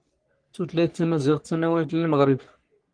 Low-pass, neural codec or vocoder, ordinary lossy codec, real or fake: 9.9 kHz; codec, 44.1 kHz, 3.4 kbps, Pupu-Codec; Opus, 24 kbps; fake